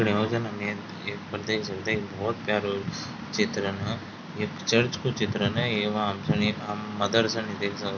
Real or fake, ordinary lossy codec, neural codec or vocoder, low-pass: real; none; none; 7.2 kHz